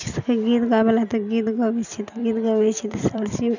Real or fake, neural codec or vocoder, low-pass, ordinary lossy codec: fake; vocoder, 44.1 kHz, 128 mel bands every 256 samples, BigVGAN v2; 7.2 kHz; none